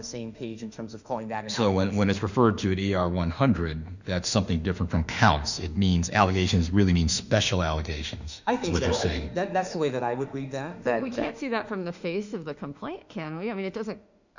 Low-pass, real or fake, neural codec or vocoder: 7.2 kHz; fake; autoencoder, 48 kHz, 32 numbers a frame, DAC-VAE, trained on Japanese speech